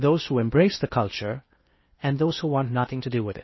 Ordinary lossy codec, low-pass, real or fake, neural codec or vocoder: MP3, 24 kbps; 7.2 kHz; fake; codec, 16 kHz in and 24 kHz out, 0.8 kbps, FocalCodec, streaming, 65536 codes